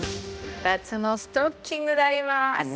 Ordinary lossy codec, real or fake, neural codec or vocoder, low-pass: none; fake; codec, 16 kHz, 1 kbps, X-Codec, HuBERT features, trained on balanced general audio; none